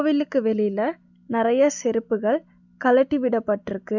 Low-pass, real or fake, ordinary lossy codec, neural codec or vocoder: 7.2 kHz; real; none; none